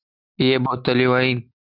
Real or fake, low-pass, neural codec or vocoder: real; 5.4 kHz; none